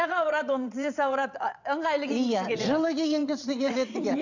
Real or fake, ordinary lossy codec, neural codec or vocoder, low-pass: fake; none; vocoder, 22.05 kHz, 80 mel bands, WaveNeXt; 7.2 kHz